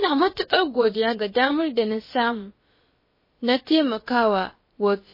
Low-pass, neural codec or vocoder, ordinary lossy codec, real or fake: 5.4 kHz; codec, 16 kHz, about 1 kbps, DyCAST, with the encoder's durations; MP3, 24 kbps; fake